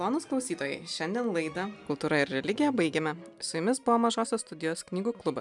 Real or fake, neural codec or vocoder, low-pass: real; none; 10.8 kHz